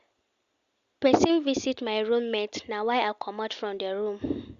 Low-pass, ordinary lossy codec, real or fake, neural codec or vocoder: 7.2 kHz; Opus, 64 kbps; real; none